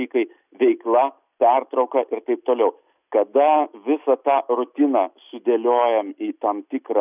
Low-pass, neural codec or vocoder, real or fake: 3.6 kHz; none; real